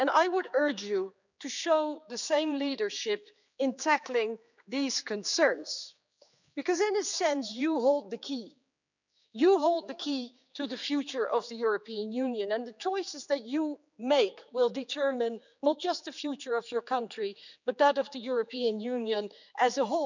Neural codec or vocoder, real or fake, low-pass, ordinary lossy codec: codec, 16 kHz, 4 kbps, X-Codec, HuBERT features, trained on general audio; fake; 7.2 kHz; none